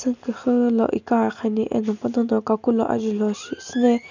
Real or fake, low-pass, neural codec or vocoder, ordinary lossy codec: real; 7.2 kHz; none; none